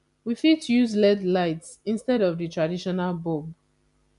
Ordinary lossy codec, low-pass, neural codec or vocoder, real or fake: none; 10.8 kHz; none; real